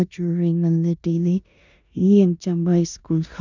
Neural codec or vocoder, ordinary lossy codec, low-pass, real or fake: codec, 16 kHz in and 24 kHz out, 0.9 kbps, LongCat-Audio-Codec, four codebook decoder; none; 7.2 kHz; fake